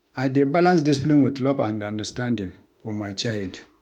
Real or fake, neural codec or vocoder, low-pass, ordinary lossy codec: fake; autoencoder, 48 kHz, 32 numbers a frame, DAC-VAE, trained on Japanese speech; 19.8 kHz; none